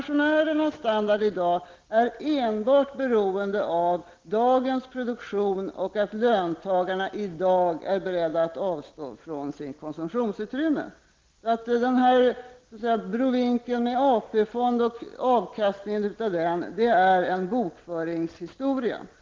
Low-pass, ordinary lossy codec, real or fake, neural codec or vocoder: 7.2 kHz; Opus, 16 kbps; real; none